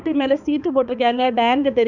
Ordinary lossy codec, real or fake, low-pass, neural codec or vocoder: none; fake; 7.2 kHz; codec, 16 kHz, 4 kbps, X-Codec, HuBERT features, trained on balanced general audio